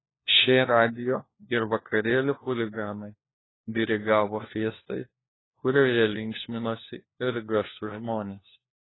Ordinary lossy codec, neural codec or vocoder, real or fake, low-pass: AAC, 16 kbps; codec, 16 kHz, 1 kbps, FunCodec, trained on LibriTTS, 50 frames a second; fake; 7.2 kHz